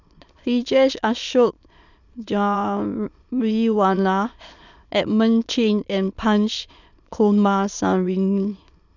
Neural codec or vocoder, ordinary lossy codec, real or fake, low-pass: autoencoder, 22.05 kHz, a latent of 192 numbers a frame, VITS, trained on many speakers; none; fake; 7.2 kHz